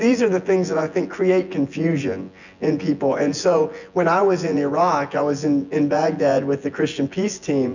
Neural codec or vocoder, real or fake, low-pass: vocoder, 24 kHz, 100 mel bands, Vocos; fake; 7.2 kHz